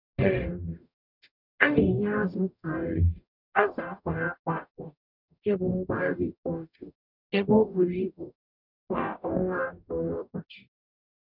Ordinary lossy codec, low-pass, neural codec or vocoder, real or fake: none; 5.4 kHz; codec, 44.1 kHz, 0.9 kbps, DAC; fake